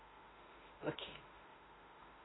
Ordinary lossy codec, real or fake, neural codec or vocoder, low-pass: AAC, 16 kbps; fake; autoencoder, 48 kHz, 32 numbers a frame, DAC-VAE, trained on Japanese speech; 7.2 kHz